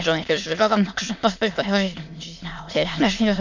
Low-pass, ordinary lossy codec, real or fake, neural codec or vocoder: 7.2 kHz; none; fake; autoencoder, 22.05 kHz, a latent of 192 numbers a frame, VITS, trained on many speakers